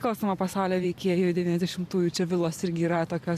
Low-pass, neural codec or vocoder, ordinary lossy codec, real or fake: 14.4 kHz; vocoder, 44.1 kHz, 128 mel bands every 512 samples, BigVGAN v2; AAC, 96 kbps; fake